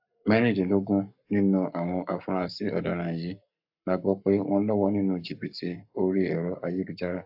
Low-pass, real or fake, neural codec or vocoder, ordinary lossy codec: 5.4 kHz; fake; codec, 44.1 kHz, 7.8 kbps, Pupu-Codec; AAC, 48 kbps